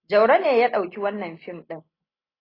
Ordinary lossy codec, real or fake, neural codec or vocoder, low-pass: AAC, 24 kbps; real; none; 5.4 kHz